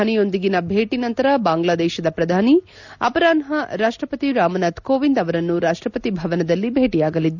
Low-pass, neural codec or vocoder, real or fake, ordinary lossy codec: 7.2 kHz; none; real; none